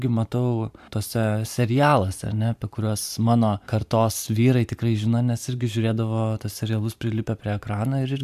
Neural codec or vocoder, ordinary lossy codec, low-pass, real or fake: vocoder, 44.1 kHz, 128 mel bands every 512 samples, BigVGAN v2; AAC, 96 kbps; 14.4 kHz; fake